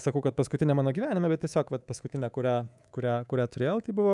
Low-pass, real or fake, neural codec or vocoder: 10.8 kHz; fake; codec, 24 kHz, 3.1 kbps, DualCodec